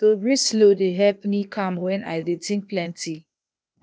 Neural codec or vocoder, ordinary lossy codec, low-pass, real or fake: codec, 16 kHz, 0.8 kbps, ZipCodec; none; none; fake